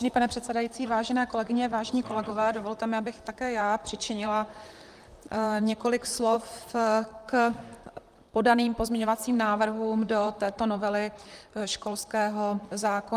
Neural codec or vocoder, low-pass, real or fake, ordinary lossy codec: vocoder, 44.1 kHz, 128 mel bands, Pupu-Vocoder; 14.4 kHz; fake; Opus, 32 kbps